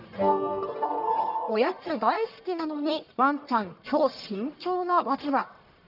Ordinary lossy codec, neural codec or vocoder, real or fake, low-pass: none; codec, 44.1 kHz, 1.7 kbps, Pupu-Codec; fake; 5.4 kHz